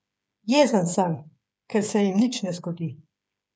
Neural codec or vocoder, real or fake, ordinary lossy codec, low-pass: codec, 16 kHz, 8 kbps, FreqCodec, smaller model; fake; none; none